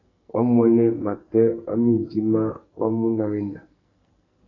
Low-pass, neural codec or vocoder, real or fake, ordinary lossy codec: 7.2 kHz; codec, 44.1 kHz, 2.6 kbps, SNAC; fake; AAC, 32 kbps